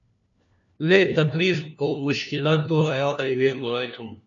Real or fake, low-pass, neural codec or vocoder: fake; 7.2 kHz; codec, 16 kHz, 1 kbps, FunCodec, trained on LibriTTS, 50 frames a second